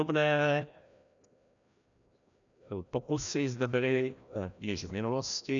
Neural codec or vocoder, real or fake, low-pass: codec, 16 kHz, 1 kbps, FreqCodec, larger model; fake; 7.2 kHz